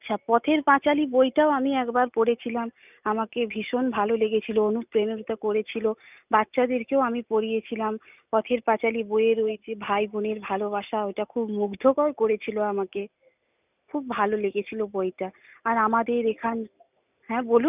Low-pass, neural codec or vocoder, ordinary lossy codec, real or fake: 3.6 kHz; none; none; real